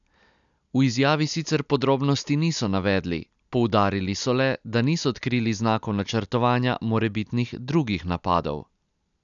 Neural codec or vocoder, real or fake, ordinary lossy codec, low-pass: none; real; none; 7.2 kHz